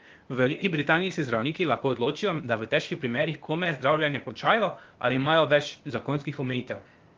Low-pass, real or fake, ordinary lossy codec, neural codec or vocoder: 7.2 kHz; fake; Opus, 32 kbps; codec, 16 kHz, 0.8 kbps, ZipCodec